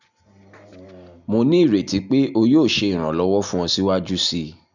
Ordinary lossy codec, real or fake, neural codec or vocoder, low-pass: none; real; none; 7.2 kHz